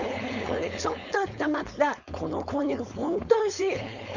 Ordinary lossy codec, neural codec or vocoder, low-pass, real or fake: none; codec, 16 kHz, 4.8 kbps, FACodec; 7.2 kHz; fake